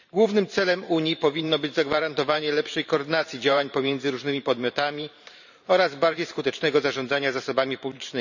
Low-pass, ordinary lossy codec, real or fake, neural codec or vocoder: 7.2 kHz; none; fake; vocoder, 44.1 kHz, 128 mel bands every 512 samples, BigVGAN v2